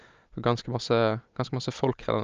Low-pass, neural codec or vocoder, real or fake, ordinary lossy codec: 7.2 kHz; none; real; Opus, 24 kbps